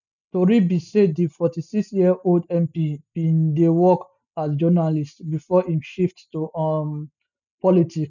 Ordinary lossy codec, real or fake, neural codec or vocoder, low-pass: none; real; none; 7.2 kHz